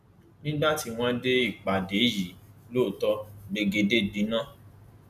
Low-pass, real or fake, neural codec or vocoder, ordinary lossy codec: 14.4 kHz; real; none; none